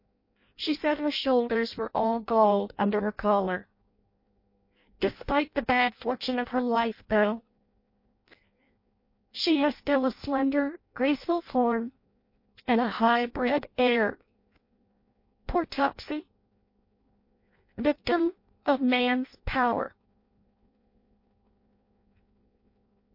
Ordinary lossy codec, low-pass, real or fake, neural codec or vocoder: MP3, 32 kbps; 5.4 kHz; fake; codec, 16 kHz in and 24 kHz out, 0.6 kbps, FireRedTTS-2 codec